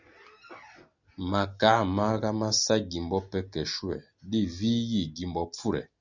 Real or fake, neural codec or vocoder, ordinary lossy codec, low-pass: real; none; Opus, 64 kbps; 7.2 kHz